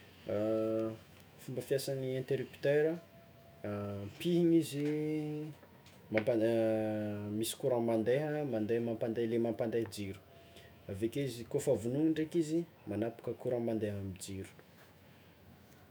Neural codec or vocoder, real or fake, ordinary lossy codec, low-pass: autoencoder, 48 kHz, 128 numbers a frame, DAC-VAE, trained on Japanese speech; fake; none; none